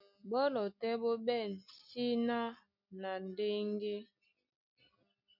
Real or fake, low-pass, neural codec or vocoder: real; 5.4 kHz; none